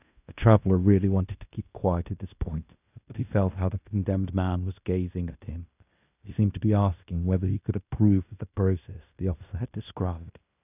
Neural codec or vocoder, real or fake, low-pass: codec, 16 kHz in and 24 kHz out, 0.9 kbps, LongCat-Audio-Codec, fine tuned four codebook decoder; fake; 3.6 kHz